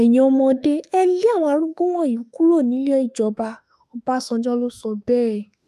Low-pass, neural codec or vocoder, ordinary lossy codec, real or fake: 14.4 kHz; autoencoder, 48 kHz, 32 numbers a frame, DAC-VAE, trained on Japanese speech; none; fake